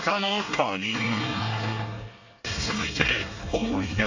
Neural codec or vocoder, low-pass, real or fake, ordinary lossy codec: codec, 24 kHz, 1 kbps, SNAC; 7.2 kHz; fake; AAC, 48 kbps